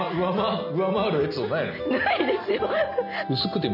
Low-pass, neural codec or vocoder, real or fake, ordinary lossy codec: 5.4 kHz; none; real; none